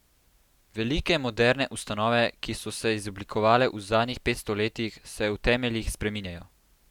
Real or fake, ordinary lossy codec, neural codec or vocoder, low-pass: real; none; none; 19.8 kHz